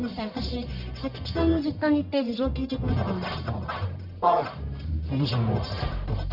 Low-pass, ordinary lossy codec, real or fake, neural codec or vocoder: 5.4 kHz; none; fake; codec, 44.1 kHz, 1.7 kbps, Pupu-Codec